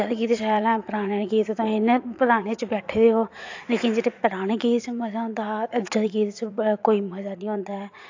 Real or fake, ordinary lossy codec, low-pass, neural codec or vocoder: fake; AAC, 48 kbps; 7.2 kHz; vocoder, 44.1 kHz, 80 mel bands, Vocos